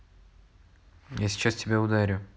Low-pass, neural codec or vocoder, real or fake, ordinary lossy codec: none; none; real; none